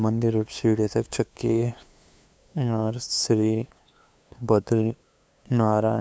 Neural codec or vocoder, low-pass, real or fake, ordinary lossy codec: codec, 16 kHz, 2 kbps, FunCodec, trained on LibriTTS, 25 frames a second; none; fake; none